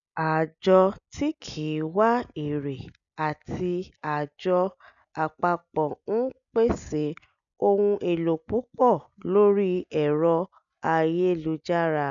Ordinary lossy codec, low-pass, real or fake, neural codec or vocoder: none; 7.2 kHz; real; none